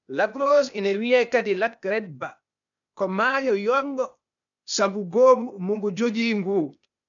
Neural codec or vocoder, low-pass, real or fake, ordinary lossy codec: codec, 16 kHz, 0.8 kbps, ZipCodec; 7.2 kHz; fake; none